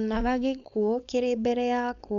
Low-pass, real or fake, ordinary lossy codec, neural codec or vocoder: 7.2 kHz; fake; none; codec, 16 kHz, 4.8 kbps, FACodec